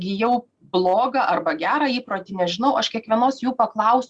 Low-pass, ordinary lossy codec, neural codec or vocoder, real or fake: 9.9 kHz; Opus, 64 kbps; none; real